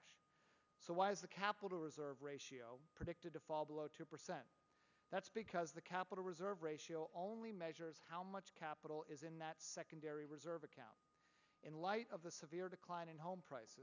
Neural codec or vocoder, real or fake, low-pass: none; real; 7.2 kHz